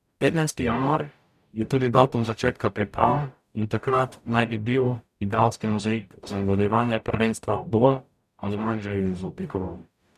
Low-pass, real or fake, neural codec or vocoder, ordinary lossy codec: 14.4 kHz; fake; codec, 44.1 kHz, 0.9 kbps, DAC; none